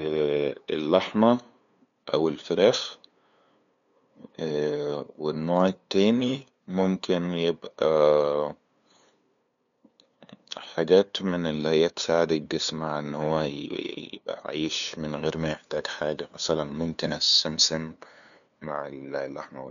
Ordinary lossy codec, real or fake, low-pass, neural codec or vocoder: none; fake; 7.2 kHz; codec, 16 kHz, 2 kbps, FunCodec, trained on LibriTTS, 25 frames a second